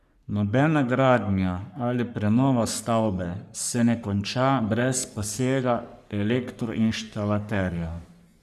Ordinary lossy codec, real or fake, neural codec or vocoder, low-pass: none; fake; codec, 44.1 kHz, 3.4 kbps, Pupu-Codec; 14.4 kHz